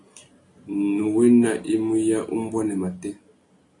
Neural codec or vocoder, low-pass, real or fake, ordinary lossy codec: none; 10.8 kHz; real; AAC, 48 kbps